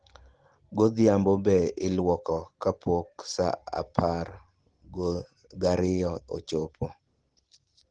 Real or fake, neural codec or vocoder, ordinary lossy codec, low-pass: real; none; Opus, 16 kbps; 9.9 kHz